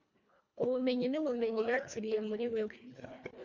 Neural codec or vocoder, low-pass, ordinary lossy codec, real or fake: codec, 24 kHz, 1.5 kbps, HILCodec; 7.2 kHz; MP3, 64 kbps; fake